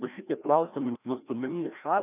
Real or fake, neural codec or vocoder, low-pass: fake; codec, 16 kHz, 1 kbps, FreqCodec, larger model; 3.6 kHz